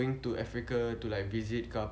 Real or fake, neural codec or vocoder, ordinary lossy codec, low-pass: real; none; none; none